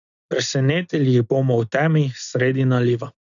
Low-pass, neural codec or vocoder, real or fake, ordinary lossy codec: 7.2 kHz; none; real; none